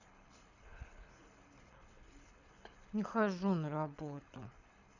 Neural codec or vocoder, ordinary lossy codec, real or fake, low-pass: codec, 24 kHz, 6 kbps, HILCodec; none; fake; 7.2 kHz